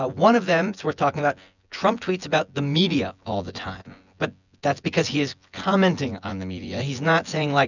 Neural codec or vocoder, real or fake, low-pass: vocoder, 24 kHz, 100 mel bands, Vocos; fake; 7.2 kHz